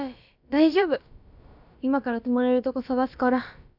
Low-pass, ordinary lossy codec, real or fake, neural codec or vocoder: 5.4 kHz; none; fake; codec, 16 kHz, about 1 kbps, DyCAST, with the encoder's durations